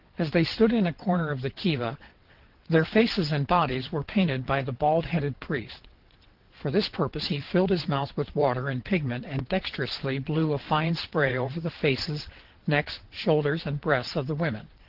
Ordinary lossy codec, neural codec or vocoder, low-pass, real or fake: Opus, 16 kbps; vocoder, 22.05 kHz, 80 mel bands, WaveNeXt; 5.4 kHz; fake